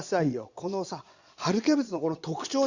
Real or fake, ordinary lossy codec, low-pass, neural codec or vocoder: fake; Opus, 64 kbps; 7.2 kHz; vocoder, 22.05 kHz, 80 mel bands, Vocos